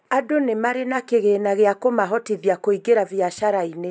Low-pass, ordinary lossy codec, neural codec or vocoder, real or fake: none; none; none; real